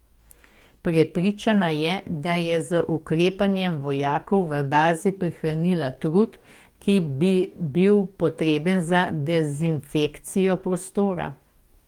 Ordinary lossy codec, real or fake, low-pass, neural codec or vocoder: Opus, 32 kbps; fake; 19.8 kHz; codec, 44.1 kHz, 2.6 kbps, DAC